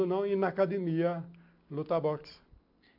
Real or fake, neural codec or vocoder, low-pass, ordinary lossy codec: real; none; 5.4 kHz; AAC, 48 kbps